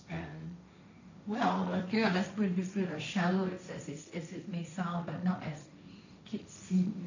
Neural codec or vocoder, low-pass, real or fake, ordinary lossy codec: codec, 16 kHz, 1.1 kbps, Voila-Tokenizer; 7.2 kHz; fake; none